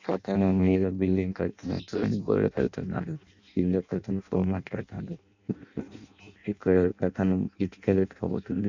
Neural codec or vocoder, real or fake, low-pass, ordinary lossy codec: codec, 16 kHz in and 24 kHz out, 0.6 kbps, FireRedTTS-2 codec; fake; 7.2 kHz; none